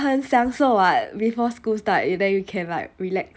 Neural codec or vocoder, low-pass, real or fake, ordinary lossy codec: none; none; real; none